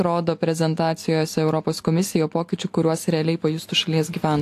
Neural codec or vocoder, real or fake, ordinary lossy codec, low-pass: none; real; AAC, 64 kbps; 14.4 kHz